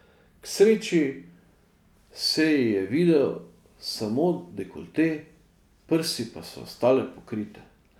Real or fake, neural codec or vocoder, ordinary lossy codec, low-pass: real; none; none; 19.8 kHz